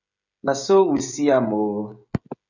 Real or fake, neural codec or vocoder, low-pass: fake; codec, 16 kHz, 16 kbps, FreqCodec, smaller model; 7.2 kHz